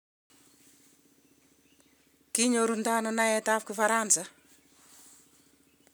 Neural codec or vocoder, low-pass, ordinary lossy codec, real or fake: none; none; none; real